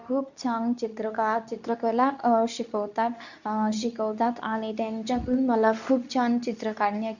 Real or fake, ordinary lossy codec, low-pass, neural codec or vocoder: fake; none; 7.2 kHz; codec, 24 kHz, 0.9 kbps, WavTokenizer, medium speech release version 2